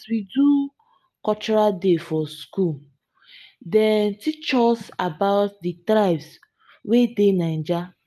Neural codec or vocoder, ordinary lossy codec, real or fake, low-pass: none; none; real; 14.4 kHz